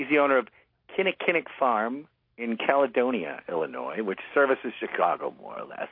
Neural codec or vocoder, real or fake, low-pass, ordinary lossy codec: none; real; 5.4 kHz; MP3, 32 kbps